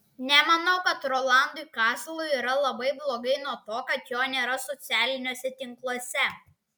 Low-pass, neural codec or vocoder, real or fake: 19.8 kHz; none; real